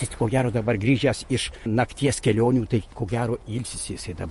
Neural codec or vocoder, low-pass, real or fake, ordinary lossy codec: vocoder, 48 kHz, 128 mel bands, Vocos; 14.4 kHz; fake; MP3, 48 kbps